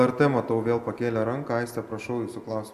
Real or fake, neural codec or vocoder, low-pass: real; none; 14.4 kHz